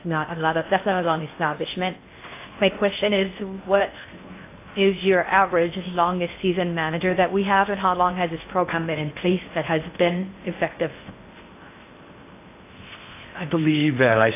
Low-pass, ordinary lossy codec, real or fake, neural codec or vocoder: 3.6 kHz; AAC, 24 kbps; fake; codec, 16 kHz in and 24 kHz out, 0.6 kbps, FocalCodec, streaming, 2048 codes